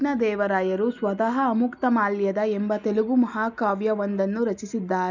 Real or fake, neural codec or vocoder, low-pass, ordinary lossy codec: real; none; 7.2 kHz; none